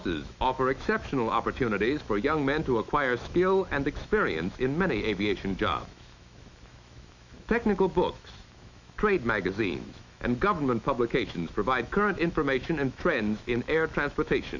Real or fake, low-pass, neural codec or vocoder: fake; 7.2 kHz; autoencoder, 48 kHz, 128 numbers a frame, DAC-VAE, trained on Japanese speech